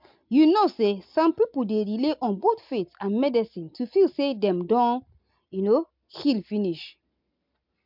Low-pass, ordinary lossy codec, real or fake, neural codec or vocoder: 5.4 kHz; MP3, 48 kbps; real; none